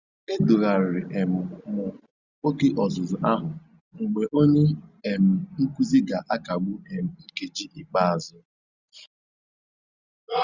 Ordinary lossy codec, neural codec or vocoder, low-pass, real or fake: Opus, 64 kbps; none; 7.2 kHz; real